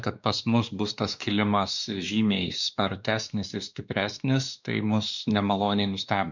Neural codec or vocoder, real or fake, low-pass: codec, 16 kHz, 2 kbps, X-Codec, WavLM features, trained on Multilingual LibriSpeech; fake; 7.2 kHz